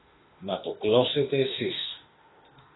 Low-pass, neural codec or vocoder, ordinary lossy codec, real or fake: 7.2 kHz; autoencoder, 48 kHz, 32 numbers a frame, DAC-VAE, trained on Japanese speech; AAC, 16 kbps; fake